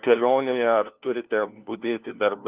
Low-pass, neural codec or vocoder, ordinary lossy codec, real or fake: 3.6 kHz; codec, 16 kHz, 1 kbps, FunCodec, trained on LibriTTS, 50 frames a second; Opus, 24 kbps; fake